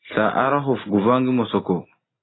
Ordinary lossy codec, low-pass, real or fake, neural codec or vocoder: AAC, 16 kbps; 7.2 kHz; real; none